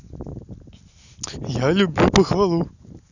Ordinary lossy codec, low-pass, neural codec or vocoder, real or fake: none; 7.2 kHz; none; real